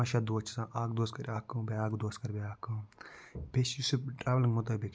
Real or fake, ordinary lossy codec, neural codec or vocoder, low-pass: real; none; none; none